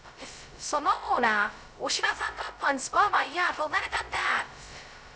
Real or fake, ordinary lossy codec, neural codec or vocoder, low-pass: fake; none; codec, 16 kHz, 0.2 kbps, FocalCodec; none